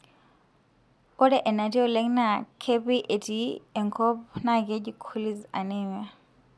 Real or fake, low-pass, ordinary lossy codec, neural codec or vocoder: real; none; none; none